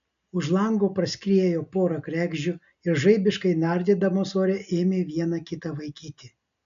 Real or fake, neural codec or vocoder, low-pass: real; none; 7.2 kHz